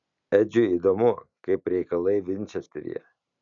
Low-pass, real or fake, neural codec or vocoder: 7.2 kHz; real; none